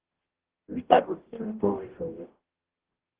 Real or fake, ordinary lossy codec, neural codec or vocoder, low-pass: fake; Opus, 16 kbps; codec, 44.1 kHz, 0.9 kbps, DAC; 3.6 kHz